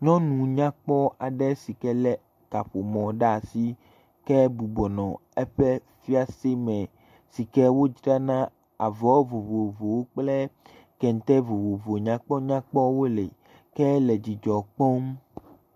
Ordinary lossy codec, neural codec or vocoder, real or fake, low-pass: MP3, 64 kbps; none; real; 14.4 kHz